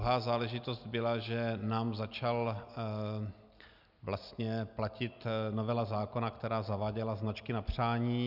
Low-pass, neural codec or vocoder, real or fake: 5.4 kHz; none; real